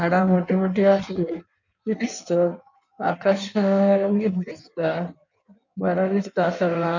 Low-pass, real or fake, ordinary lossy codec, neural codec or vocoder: 7.2 kHz; fake; none; codec, 16 kHz in and 24 kHz out, 1.1 kbps, FireRedTTS-2 codec